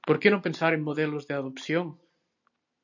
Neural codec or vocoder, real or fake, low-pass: none; real; 7.2 kHz